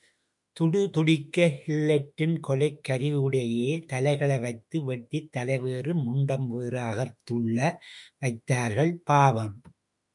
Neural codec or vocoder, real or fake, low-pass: autoencoder, 48 kHz, 32 numbers a frame, DAC-VAE, trained on Japanese speech; fake; 10.8 kHz